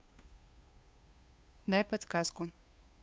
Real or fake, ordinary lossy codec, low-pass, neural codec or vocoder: fake; none; none; codec, 16 kHz, 2 kbps, FunCodec, trained on Chinese and English, 25 frames a second